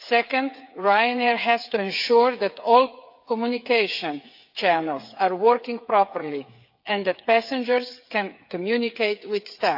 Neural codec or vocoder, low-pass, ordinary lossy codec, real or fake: codec, 16 kHz, 8 kbps, FreqCodec, smaller model; 5.4 kHz; AAC, 48 kbps; fake